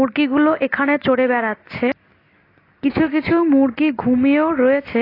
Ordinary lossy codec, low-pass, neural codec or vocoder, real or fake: AAC, 24 kbps; 5.4 kHz; none; real